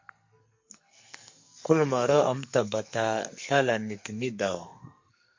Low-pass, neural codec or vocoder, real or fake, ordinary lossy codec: 7.2 kHz; codec, 44.1 kHz, 2.6 kbps, SNAC; fake; MP3, 48 kbps